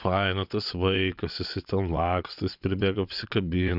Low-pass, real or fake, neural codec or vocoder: 5.4 kHz; fake; vocoder, 22.05 kHz, 80 mel bands, WaveNeXt